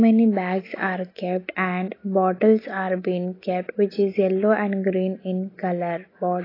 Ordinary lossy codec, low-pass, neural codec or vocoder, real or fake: AAC, 24 kbps; 5.4 kHz; none; real